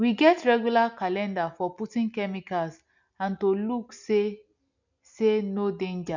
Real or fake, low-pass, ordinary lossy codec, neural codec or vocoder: real; 7.2 kHz; none; none